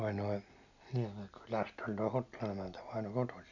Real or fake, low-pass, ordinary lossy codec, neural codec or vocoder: real; 7.2 kHz; none; none